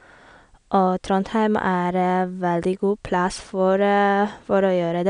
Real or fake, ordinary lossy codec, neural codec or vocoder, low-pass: real; none; none; 9.9 kHz